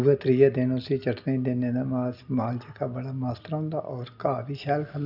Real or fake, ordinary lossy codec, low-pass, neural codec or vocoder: real; none; 5.4 kHz; none